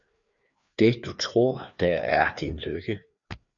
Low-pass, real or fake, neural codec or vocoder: 7.2 kHz; fake; codec, 16 kHz, 2 kbps, FreqCodec, larger model